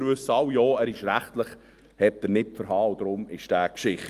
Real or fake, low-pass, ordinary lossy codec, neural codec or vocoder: real; 14.4 kHz; Opus, 32 kbps; none